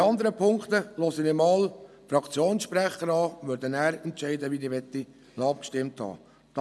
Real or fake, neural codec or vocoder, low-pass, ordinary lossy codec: real; none; none; none